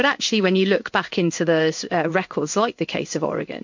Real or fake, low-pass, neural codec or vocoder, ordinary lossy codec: fake; 7.2 kHz; codec, 16 kHz in and 24 kHz out, 1 kbps, XY-Tokenizer; MP3, 48 kbps